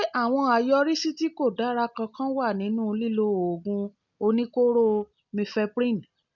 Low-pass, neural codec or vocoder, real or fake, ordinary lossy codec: 7.2 kHz; none; real; none